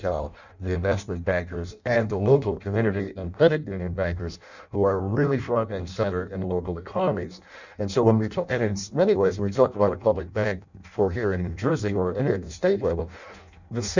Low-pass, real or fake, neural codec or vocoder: 7.2 kHz; fake; codec, 16 kHz in and 24 kHz out, 0.6 kbps, FireRedTTS-2 codec